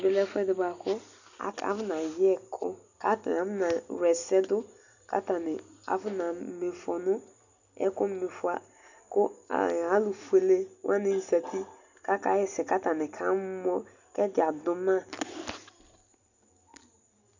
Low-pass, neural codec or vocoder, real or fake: 7.2 kHz; none; real